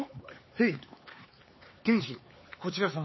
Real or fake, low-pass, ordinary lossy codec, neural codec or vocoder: fake; 7.2 kHz; MP3, 24 kbps; codec, 16 kHz, 4 kbps, X-Codec, HuBERT features, trained on LibriSpeech